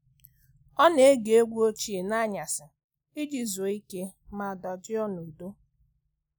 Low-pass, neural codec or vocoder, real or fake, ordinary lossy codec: none; none; real; none